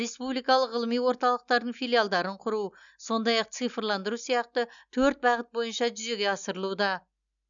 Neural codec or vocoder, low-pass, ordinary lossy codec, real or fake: none; 7.2 kHz; none; real